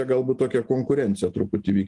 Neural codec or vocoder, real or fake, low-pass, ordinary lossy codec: none; real; 10.8 kHz; Opus, 24 kbps